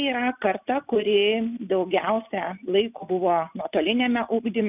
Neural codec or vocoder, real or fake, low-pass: none; real; 3.6 kHz